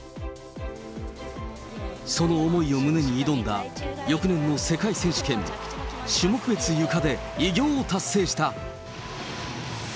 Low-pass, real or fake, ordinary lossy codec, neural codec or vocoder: none; real; none; none